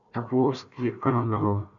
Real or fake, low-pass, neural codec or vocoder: fake; 7.2 kHz; codec, 16 kHz, 1 kbps, FunCodec, trained on Chinese and English, 50 frames a second